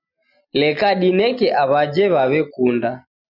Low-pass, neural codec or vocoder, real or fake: 5.4 kHz; none; real